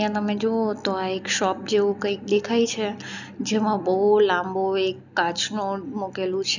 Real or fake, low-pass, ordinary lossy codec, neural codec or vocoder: real; 7.2 kHz; none; none